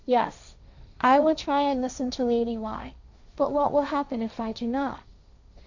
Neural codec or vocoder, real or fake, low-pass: codec, 16 kHz, 1.1 kbps, Voila-Tokenizer; fake; 7.2 kHz